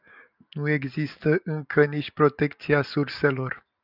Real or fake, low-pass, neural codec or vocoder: real; 5.4 kHz; none